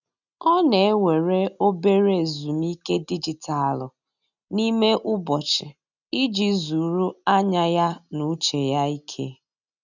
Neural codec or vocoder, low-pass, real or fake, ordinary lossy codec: none; 7.2 kHz; real; none